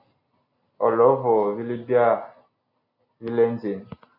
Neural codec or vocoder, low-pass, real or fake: none; 5.4 kHz; real